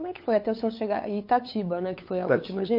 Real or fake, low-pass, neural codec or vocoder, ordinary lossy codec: fake; 5.4 kHz; codec, 16 kHz, 8 kbps, FunCodec, trained on LibriTTS, 25 frames a second; MP3, 32 kbps